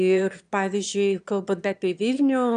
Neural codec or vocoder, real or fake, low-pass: autoencoder, 22.05 kHz, a latent of 192 numbers a frame, VITS, trained on one speaker; fake; 9.9 kHz